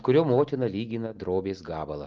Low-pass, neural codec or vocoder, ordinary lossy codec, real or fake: 7.2 kHz; none; Opus, 32 kbps; real